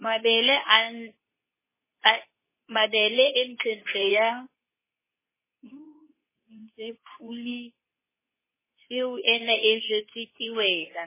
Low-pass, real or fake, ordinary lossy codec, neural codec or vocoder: 3.6 kHz; fake; MP3, 16 kbps; codec, 24 kHz, 0.9 kbps, WavTokenizer, medium speech release version 2